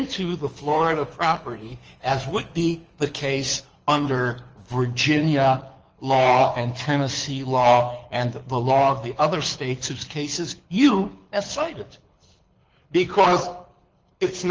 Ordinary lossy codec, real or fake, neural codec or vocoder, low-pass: Opus, 24 kbps; fake; codec, 24 kHz, 6 kbps, HILCodec; 7.2 kHz